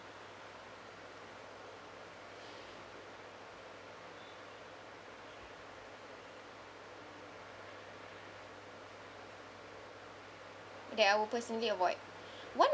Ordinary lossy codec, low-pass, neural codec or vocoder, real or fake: none; none; none; real